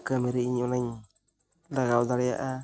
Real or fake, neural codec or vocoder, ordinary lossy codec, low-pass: real; none; none; none